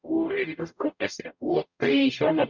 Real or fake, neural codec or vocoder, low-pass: fake; codec, 44.1 kHz, 0.9 kbps, DAC; 7.2 kHz